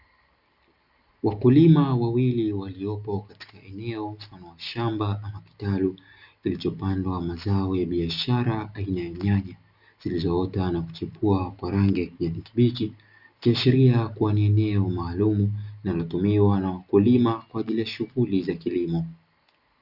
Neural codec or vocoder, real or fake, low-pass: none; real; 5.4 kHz